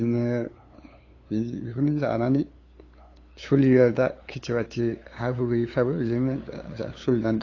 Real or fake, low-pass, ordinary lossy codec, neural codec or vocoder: fake; 7.2 kHz; AAC, 32 kbps; codec, 16 kHz, 8 kbps, FunCodec, trained on LibriTTS, 25 frames a second